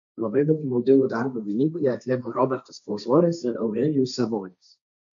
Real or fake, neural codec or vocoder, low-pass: fake; codec, 16 kHz, 1.1 kbps, Voila-Tokenizer; 7.2 kHz